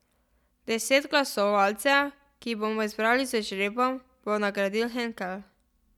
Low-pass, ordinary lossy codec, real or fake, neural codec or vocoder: 19.8 kHz; none; real; none